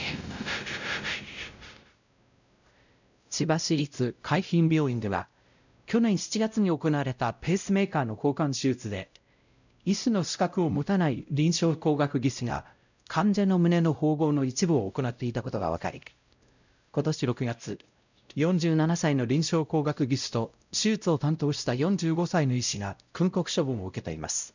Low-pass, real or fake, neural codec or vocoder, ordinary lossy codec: 7.2 kHz; fake; codec, 16 kHz, 0.5 kbps, X-Codec, WavLM features, trained on Multilingual LibriSpeech; none